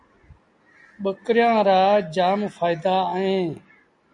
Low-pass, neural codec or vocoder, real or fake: 10.8 kHz; none; real